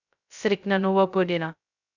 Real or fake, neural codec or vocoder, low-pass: fake; codec, 16 kHz, 0.2 kbps, FocalCodec; 7.2 kHz